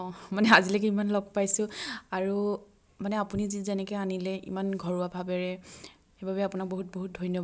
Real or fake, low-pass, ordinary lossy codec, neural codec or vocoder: real; none; none; none